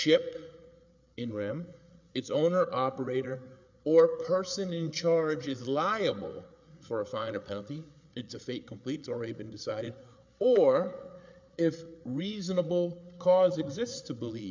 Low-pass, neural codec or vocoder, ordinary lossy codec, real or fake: 7.2 kHz; codec, 16 kHz, 8 kbps, FreqCodec, larger model; MP3, 64 kbps; fake